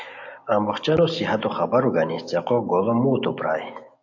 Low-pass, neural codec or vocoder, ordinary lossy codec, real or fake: 7.2 kHz; none; AAC, 48 kbps; real